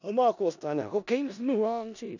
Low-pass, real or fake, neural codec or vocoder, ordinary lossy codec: 7.2 kHz; fake; codec, 16 kHz in and 24 kHz out, 0.4 kbps, LongCat-Audio-Codec, four codebook decoder; none